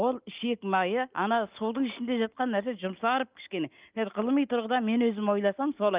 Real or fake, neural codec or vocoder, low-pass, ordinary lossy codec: real; none; 3.6 kHz; Opus, 24 kbps